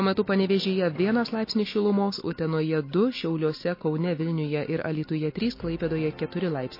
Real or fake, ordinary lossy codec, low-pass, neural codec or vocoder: real; MP3, 24 kbps; 5.4 kHz; none